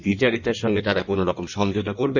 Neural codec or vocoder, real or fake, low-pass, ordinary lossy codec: codec, 16 kHz in and 24 kHz out, 1.1 kbps, FireRedTTS-2 codec; fake; 7.2 kHz; none